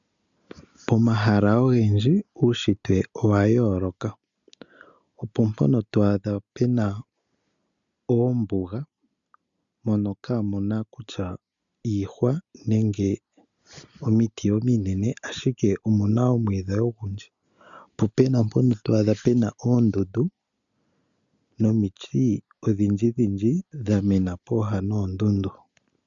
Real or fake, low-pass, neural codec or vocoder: real; 7.2 kHz; none